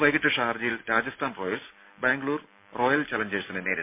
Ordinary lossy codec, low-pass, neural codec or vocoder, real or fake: MP3, 32 kbps; 3.6 kHz; none; real